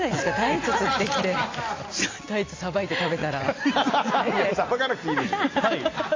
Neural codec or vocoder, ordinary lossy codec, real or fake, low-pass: none; AAC, 32 kbps; real; 7.2 kHz